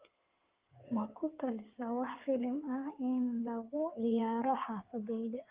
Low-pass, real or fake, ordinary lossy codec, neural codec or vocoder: 3.6 kHz; fake; Opus, 32 kbps; codec, 16 kHz in and 24 kHz out, 2.2 kbps, FireRedTTS-2 codec